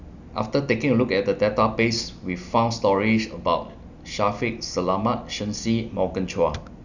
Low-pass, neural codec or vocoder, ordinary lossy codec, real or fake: 7.2 kHz; none; none; real